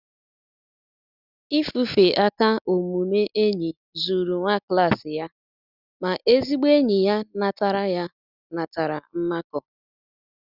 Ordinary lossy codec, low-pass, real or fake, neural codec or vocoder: Opus, 64 kbps; 5.4 kHz; real; none